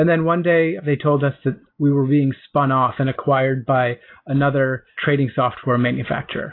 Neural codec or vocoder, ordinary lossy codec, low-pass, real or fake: none; AAC, 32 kbps; 5.4 kHz; real